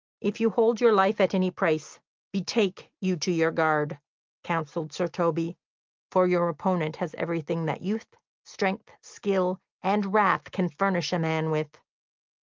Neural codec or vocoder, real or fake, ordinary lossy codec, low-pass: none; real; Opus, 24 kbps; 7.2 kHz